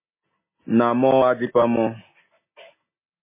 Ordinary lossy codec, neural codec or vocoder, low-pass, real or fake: MP3, 16 kbps; none; 3.6 kHz; real